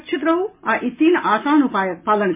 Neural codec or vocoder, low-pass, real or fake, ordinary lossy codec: none; 3.6 kHz; real; MP3, 24 kbps